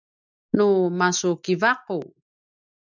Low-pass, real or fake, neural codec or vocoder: 7.2 kHz; real; none